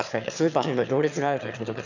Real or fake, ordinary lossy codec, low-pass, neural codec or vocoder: fake; none; 7.2 kHz; autoencoder, 22.05 kHz, a latent of 192 numbers a frame, VITS, trained on one speaker